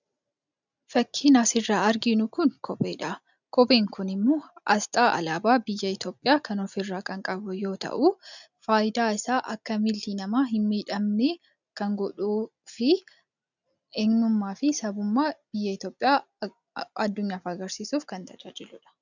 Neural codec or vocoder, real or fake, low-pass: none; real; 7.2 kHz